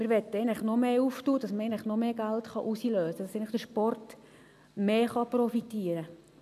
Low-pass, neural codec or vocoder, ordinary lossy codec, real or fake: 14.4 kHz; none; none; real